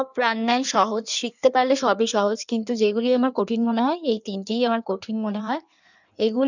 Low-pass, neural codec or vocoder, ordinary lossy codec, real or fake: 7.2 kHz; codec, 16 kHz in and 24 kHz out, 1.1 kbps, FireRedTTS-2 codec; none; fake